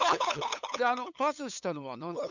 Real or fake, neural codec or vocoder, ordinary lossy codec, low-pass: fake; codec, 16 kHz, 8 kbps, FunCodec, trained on LibriTTS, 25 frames a second; none; 7.2 kHz